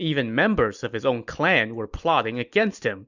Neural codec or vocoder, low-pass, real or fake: none; 7.2 kHz; real